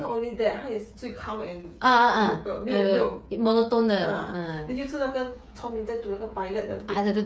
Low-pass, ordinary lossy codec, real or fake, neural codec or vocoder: none; none; fake; codec, 16 kHz, 8 kbps, FreqCodec, smaller model